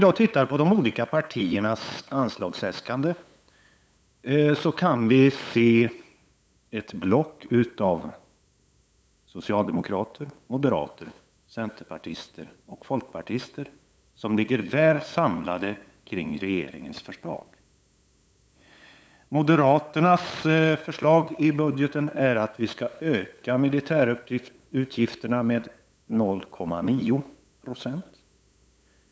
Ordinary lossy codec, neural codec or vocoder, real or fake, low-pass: none; codec, 16 kHz, 8 kbps, FunCodec, trained on LibriTTS, 25 frames a second; fake; none